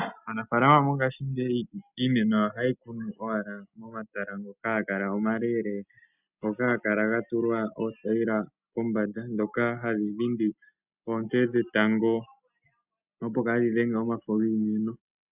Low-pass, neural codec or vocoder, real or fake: 3.6 kHz; none; real